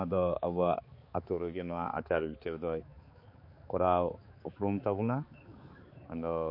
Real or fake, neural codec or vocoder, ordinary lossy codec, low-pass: fake; codec, 16 kHz, 4 kbps, X-Codec, HuBERT features, trained on balanced general audio; MP3, 32 kbps; 5.4 kHz